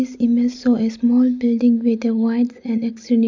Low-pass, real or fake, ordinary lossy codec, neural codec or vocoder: 7.2 kHz; real; MP3, 64 kbps; none